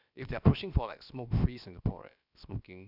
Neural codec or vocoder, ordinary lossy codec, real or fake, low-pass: codec, 16 kHz, about 1 kbps, DyCAST, with the encoder's durations; none; fake; 5.4 kHz